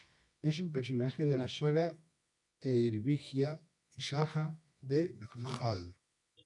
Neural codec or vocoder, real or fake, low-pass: codec, 24 kHz, 0.9 kbps, WavTokenizer, medium music audio release; fake; 10.8 kHz